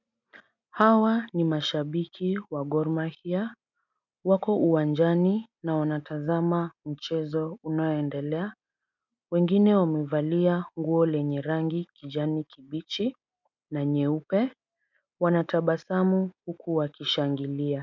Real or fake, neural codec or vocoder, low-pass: real; none; 7.2 kHz